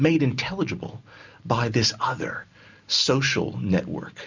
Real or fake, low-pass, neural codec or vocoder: real; 7.2 kHz; none